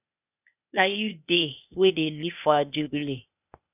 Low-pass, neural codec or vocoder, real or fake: 3.6 kHz; codec, 16 kHz, 0.8 kbps, ZipCodec; fake